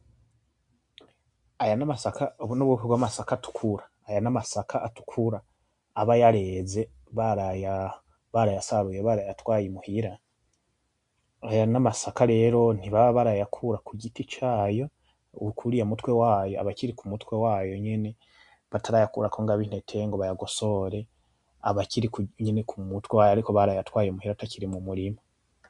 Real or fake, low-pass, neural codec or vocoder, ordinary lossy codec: real; 10.8 kHz; none; MP3, 64 kbps